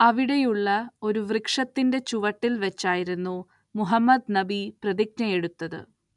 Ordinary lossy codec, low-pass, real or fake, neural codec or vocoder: none; 10.8 kHz; real; none